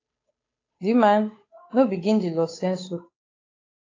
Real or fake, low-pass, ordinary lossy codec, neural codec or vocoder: fake; 7.2 kHz; AAC, 32 kbps; codec, 16 kHz, 8 kbps, FunCodec, trained on Chinese and English, 25 frames a second